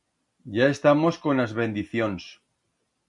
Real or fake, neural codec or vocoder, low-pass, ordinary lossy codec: real; none; 10.8 kHz; MP3, 64 kbps